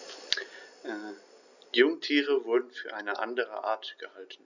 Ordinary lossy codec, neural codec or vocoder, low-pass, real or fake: none; none; 7.2 kHz; real